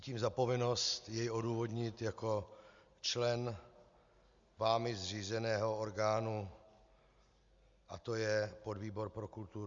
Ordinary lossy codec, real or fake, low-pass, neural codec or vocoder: Opus, 64 kbps; real; 7.2 kHz; none